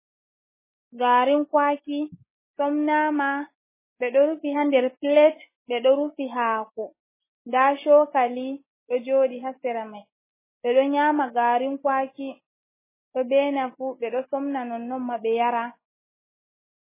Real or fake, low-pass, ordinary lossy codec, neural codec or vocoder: real; 3.6 kHz; MP3, 16 kbps; none